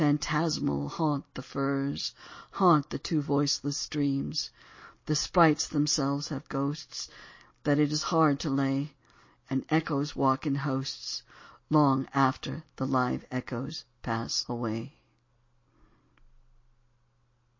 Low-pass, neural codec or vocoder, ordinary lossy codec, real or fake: 7.2 kHz; none; MP3, 32 kbps; real